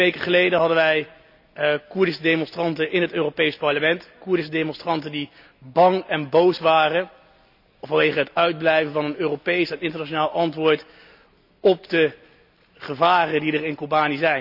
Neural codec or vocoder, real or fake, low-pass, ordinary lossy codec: none; real; 5.4 kHz; none